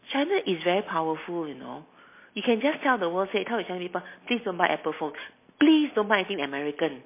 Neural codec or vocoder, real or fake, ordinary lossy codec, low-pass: none; real; MP3, 24 kbps; 3.6 kHz